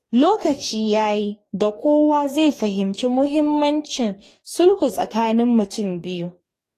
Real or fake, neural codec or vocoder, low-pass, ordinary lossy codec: fake; codec, 44.1 kHz, 2.6 kbps, DAC; 14.4 kHz; AAC, 48 kbps